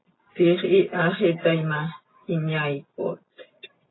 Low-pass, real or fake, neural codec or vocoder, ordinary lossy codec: 7.2 kHz; real; none; AAC, 16 kbps